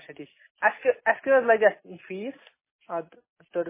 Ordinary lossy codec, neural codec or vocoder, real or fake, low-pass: MP3, 16 kbps; none; real; 3.6 kHz